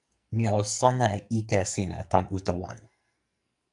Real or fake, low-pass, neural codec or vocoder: fake; 10.8 kHz; codec, 44.1 kHz, 2.6 kbps, SNAC